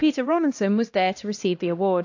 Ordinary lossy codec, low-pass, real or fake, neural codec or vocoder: AAC, 48 kbps; 7.2 kHz; fake; codec, 16 kHz, 1 kbps, X-Codec, HuBERT features, trained on LibriSpeech